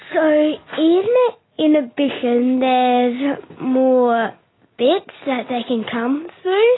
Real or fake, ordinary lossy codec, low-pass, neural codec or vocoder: real; AAC, 16 kbps; 7.2 kHz; none